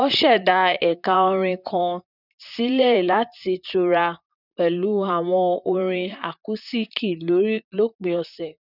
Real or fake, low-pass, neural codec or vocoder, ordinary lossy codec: fake; 5.4 kHz; vocoder, 44.1 kHz, 128 mel bands, Pupu-Vocoder; none